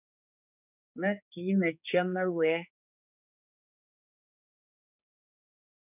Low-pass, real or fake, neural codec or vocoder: 3.6 kHz; fake; codec, 16 kHz, 4 kbps, X-Codec, HuBERT features, trained on general audio